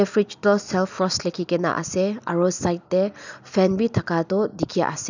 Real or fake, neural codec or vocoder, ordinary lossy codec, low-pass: real; none; none; 7.2 kHz